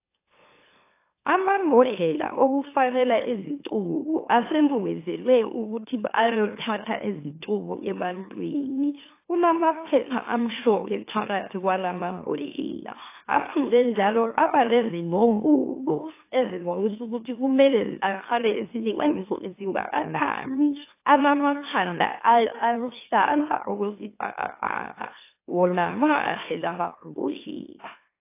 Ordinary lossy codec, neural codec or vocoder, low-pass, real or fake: AAC, 24 kbps; autoencoder, 44.1 kHz, a latent of 192 numbers a frame, MeloTTS; 3.6 kHz; fake